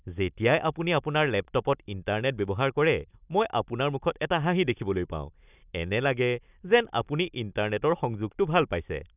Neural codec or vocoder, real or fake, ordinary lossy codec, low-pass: none; real; none; 3.6 kHz